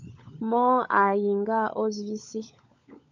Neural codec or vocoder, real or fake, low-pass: codec, 16 kHz, 16 kbps, FunCodec, trained on LibriTTS, 50 frames a second; fake; 7.2 kHz